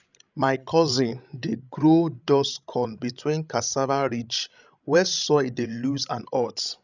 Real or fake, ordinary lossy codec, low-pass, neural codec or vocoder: fake; none; 7.2 kHz; codec, 16 kHz, 16 kbps, FreqCodec, larger model